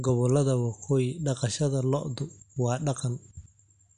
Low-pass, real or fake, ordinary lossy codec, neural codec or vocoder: 9.9 kHz; real; MP3, 64 kbps; none